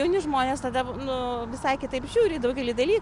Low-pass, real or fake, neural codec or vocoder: 10.8 kHz; real; none